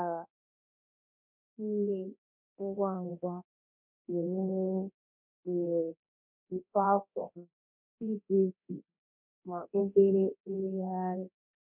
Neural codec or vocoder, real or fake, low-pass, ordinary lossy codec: codec, 24 kHz, 0.9 kbps, DualCodec; fake; 3.6 kHz; none